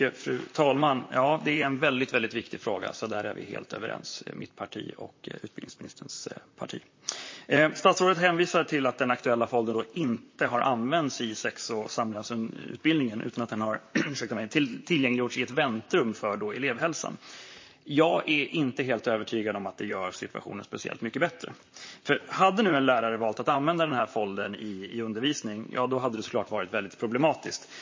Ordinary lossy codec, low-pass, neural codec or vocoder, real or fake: MP3, 32 kbps; 7.2 kHz; vocoder, 44.1 kHz, 128 mel bands, Pupu-Vocoder; fake